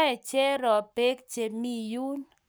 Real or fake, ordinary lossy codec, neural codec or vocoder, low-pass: fake; none; codec, 44.1 kHz, 7.8 kbps, Pupu-Codec; none